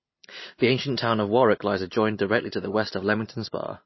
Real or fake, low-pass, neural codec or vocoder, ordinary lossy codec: real; 7.2 kHz; none; MP3, 24 kbps